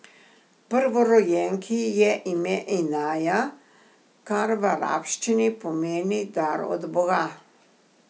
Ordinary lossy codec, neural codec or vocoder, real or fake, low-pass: none; none; real; none